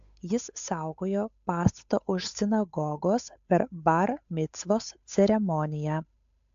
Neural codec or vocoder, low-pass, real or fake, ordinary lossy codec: codec, 16 kHz, 8 kbps, FunCodec, trained on Chinese and English, 25 frames a second; 7.2 kHz; fake; AAC, 64 kbps